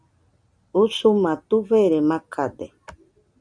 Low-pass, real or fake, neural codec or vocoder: 9.9 kHz; real; none